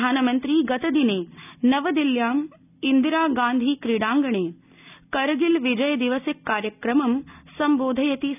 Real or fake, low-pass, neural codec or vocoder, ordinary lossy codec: real; 3.6 kHz; none; none